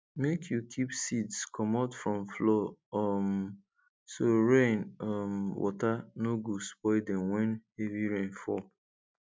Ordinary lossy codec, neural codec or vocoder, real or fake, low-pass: none; none; real; none